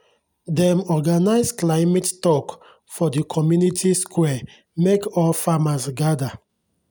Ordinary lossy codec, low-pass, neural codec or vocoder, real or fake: none; none; none; real